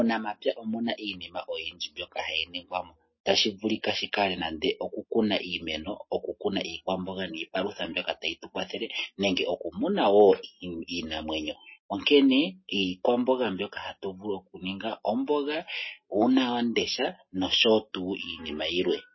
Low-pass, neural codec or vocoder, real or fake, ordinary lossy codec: 7.2 kHz; none; real; MP3, 24 kbps